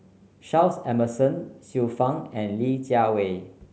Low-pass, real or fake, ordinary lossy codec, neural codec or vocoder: none; real; none; none